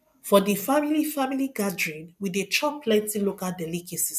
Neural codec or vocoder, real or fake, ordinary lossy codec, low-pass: none; real; none; 14.4 kHz